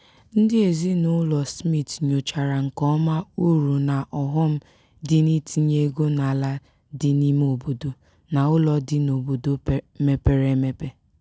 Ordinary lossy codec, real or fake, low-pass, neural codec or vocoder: none; real; none; none